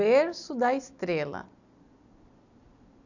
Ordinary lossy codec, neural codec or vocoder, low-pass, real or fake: none; none; 7.2 kHz; real